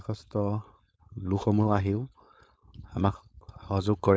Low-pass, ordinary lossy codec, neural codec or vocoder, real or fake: none; none; codec, 16 kHz, 4.8 kbps, FACodec; fake